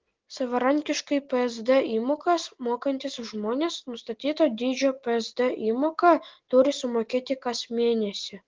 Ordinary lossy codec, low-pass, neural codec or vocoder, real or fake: Opus, 16 kbps; 7.2 kHz; none; real